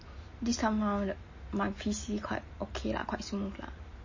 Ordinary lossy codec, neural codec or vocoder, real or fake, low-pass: MP3, 32 kbps; none; real; 7.2 kHz